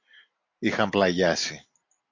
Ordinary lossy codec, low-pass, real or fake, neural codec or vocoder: MP3, 48 kbps; 7.2 kHz; real; none